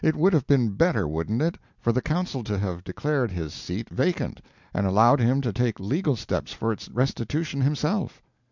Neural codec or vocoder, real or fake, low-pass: none; real; 7.2 kHz